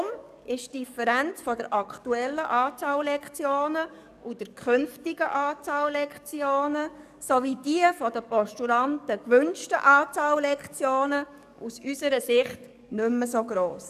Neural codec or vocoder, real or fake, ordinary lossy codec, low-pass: codec, 44.1 kHz, 7.8 kbps, DAC; fake; none; 14.4 kHz